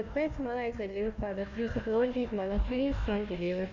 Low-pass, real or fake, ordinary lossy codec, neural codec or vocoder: 7.2 kHz; fake; none; codec, 16 kHz, 1 kbps, FunCodec, trained on Chinese and English, 50 frames a second